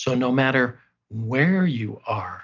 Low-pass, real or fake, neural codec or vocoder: 7.2 kHz; real; none